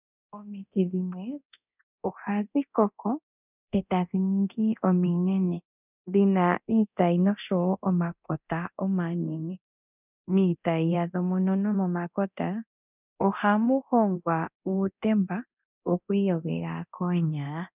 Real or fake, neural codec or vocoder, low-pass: fake; codec, 24 kHz, 0.9 kbps, DualCodec; 3.6 kHz